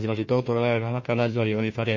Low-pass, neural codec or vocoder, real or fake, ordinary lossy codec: 7.2 kHz; codec, 16 kHz, 1 kbps, FunCodec, trained on Chinese and English, 50 frames a second; fake; MP3, 32 kbps